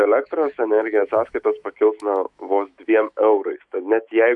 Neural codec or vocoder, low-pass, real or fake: autoencoder, 48 kHz, 128 numbers a frame, DAC-VAE, trained on Japanese speech; 10.8 kHz; fake